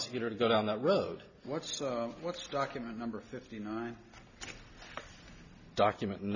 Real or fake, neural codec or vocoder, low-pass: real; none; 7.2 kHz